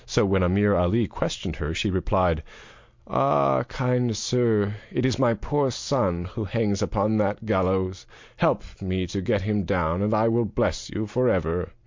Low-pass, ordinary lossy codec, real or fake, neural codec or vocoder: 7.2 kHz; MP3, 48 kbps; real; none